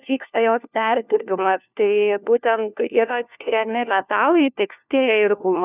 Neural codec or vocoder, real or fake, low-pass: codec, 16 kHz, 1 kbps, FunCodec, trained on LibriTTS, 50 frames a second; fake; 3.6 kHz